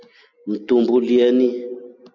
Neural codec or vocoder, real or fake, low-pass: none; real; 7.2 kHz